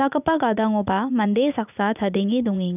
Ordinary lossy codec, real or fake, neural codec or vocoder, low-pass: none; fake; vocoder, 44.1 kHz, 80 mel bands, Vocos; 3.6 kHz